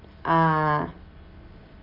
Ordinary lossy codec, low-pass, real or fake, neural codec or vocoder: Opus, 32 kbps; 5.4 kHz; real; none